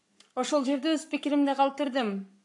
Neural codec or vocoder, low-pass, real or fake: codec, 44.1 kHz, 7.8 kbps, Pupu-Codec; 10.8 kHz; fake